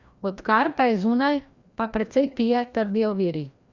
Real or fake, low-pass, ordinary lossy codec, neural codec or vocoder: fake; 7.2 kHz; Opus, 64 kbps; codec, 16 kHz, 1 kbps, FreqCodec, larger model